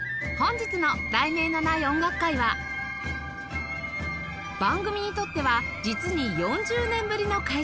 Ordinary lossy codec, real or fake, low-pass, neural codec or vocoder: none; real; none; none